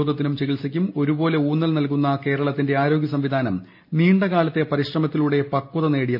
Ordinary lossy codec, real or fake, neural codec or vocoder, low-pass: MP3, 32 kbps; real; none; 5.4 kHz